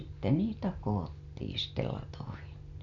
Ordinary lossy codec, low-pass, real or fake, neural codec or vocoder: MP3, 96 kbps; 7.2 kHz; real; none